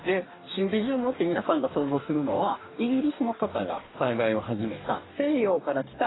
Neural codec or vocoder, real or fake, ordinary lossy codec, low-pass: codec, 44.1 kHz, 2.6 kbps, DAC; fake; AAC, 16 kbps; 7.2 kHz